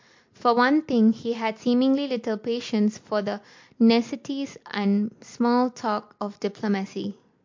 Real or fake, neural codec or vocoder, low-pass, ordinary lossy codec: real; none; 7.2 kHz; MP3, 48 kbps